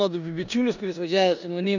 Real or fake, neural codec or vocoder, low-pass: fake; codec, 16 kHz in and 24 kHz out, 0.9 kbps, LongCat-Audio-Codec, four codebook decoder; 7.2 kHz